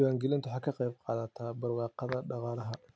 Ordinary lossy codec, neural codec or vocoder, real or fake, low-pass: none; none; real; none